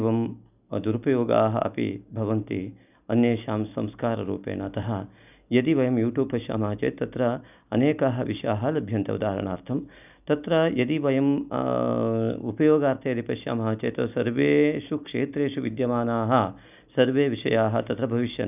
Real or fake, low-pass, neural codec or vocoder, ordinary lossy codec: real; 3.6 kHz; none; none